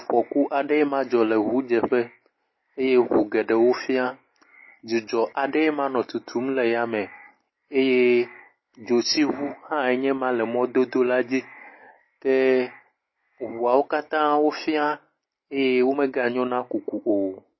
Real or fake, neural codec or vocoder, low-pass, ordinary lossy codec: real; none; 7.2 kHz; MP3, 24 kbps